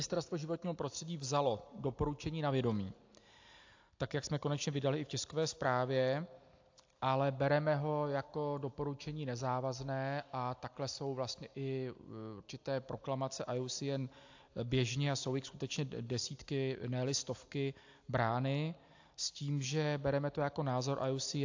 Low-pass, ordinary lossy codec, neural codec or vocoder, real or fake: 7.2 kHz; AAC, 48 kbps; none; real